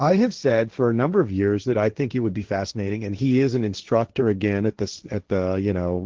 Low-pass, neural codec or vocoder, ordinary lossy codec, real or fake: 7.2 kHz; codec, 16 kHz, 1.1 kbps, Voila-Tokenizer; Opus, 16 kbps; fake